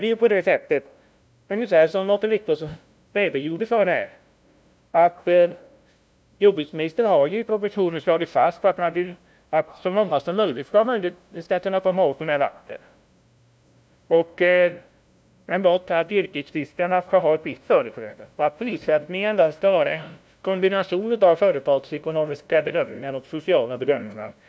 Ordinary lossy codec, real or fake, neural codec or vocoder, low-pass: none; fake; codec, 16 kHz, 0.5 kbps, FunCodec, trained on LibriTTS, 25 frames a second; none